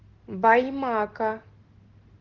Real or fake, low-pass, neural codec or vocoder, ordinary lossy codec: real; 7.2 kHz; none; Opus, 16 kbps